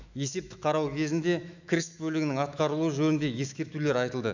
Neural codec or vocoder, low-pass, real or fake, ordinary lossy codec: autoencoder, 48 kHz, 128 numbers a frame, DAC-VAE, trained on Japanese speech; 7.2 kHz; fake; none